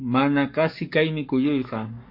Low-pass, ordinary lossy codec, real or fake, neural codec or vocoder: 5.4 kHz; MP3, 32 kbps; fake; codec, 44.1 kHz, 7.8 kbps, Pupu-Codec